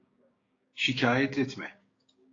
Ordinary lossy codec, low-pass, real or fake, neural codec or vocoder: AAC, 32 kbps; 7.2 kHz; fake; codec, 16 kHz, 6 kbps, DAC